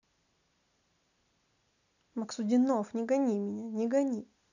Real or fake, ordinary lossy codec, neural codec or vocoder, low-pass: real; none; none; 7.2 kHz